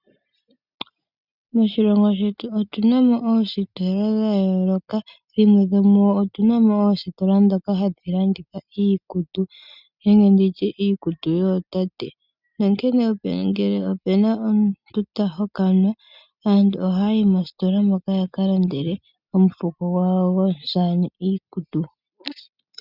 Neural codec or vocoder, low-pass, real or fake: none; 5.4 kHz; real